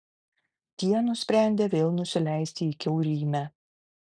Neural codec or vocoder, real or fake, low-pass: none; real; 9.9 kHz